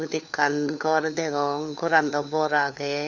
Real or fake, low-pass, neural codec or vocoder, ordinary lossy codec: fake; 7.2 kHz; codec, 16 kHz, 4 kbps, FunCodec, trained on LibriTTS, 50 frames a second; none